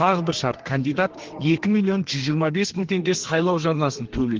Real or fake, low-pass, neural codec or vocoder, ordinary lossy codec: fake; 7.2 kHz; codec, 32 kHz, 1.9 kbps, SNAC; Opus, 16 kbps